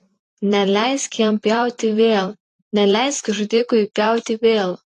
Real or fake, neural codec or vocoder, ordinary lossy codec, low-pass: fake; vocoder, 44.1 kHz, 128 mel bands, Pupu-Vocoder; AAC, 48 kbps; 14.4 kHz